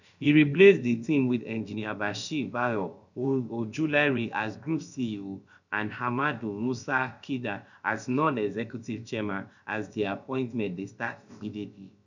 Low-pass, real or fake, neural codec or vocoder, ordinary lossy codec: 7.2 kHz; fake; codec, 16 kHz, about 1 kbps, DyCAST, with the encoder's durations; none